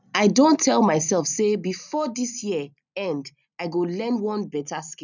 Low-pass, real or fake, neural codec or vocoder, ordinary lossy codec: 7.2 kHz; real; none; none